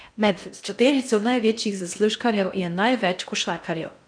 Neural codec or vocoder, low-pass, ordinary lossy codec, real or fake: codec, 16 kHz in and 24 kHz out, 0.6 kbps, FocalCodec, streaming, 4096 codes; 9.9 kHz; none; fake